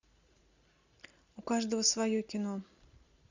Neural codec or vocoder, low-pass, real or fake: none; 7.2 kHz; real